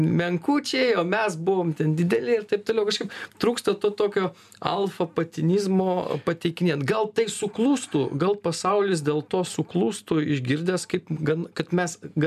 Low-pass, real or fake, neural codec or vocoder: 14.4 kHz; real; none